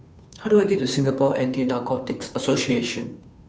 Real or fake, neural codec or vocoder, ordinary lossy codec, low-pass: fake; codec, 16 kHz, 2 kbps, FunCodec, trained on Chinese and English, 25 frames a second; none; none